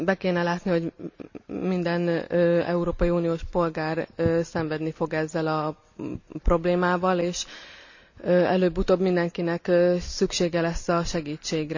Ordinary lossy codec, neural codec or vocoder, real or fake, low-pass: none; none; real; 7.2 kHz